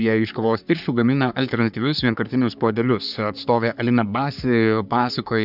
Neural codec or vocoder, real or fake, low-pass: codec, 44.1 kHz, 3.4 kbps, Pupu-Codec; fake; 5.4 kHz